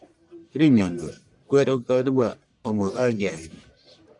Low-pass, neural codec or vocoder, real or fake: 10.8 kHz; codec, 44.1 kHz, 1.7 kbps, Pupu-Codec; fake